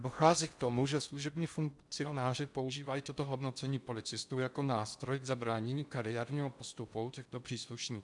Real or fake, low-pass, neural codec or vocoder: fake; 10.8 kHz; codec, 16 kHz in and 24 kHz out, 0.6 kbps, FocalCodec, streaming, 4096 codes